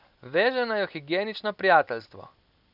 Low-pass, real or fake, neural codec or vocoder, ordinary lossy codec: 5.4 kHz; real; none; none